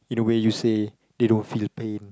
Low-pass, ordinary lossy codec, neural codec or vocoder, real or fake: none; none; none; real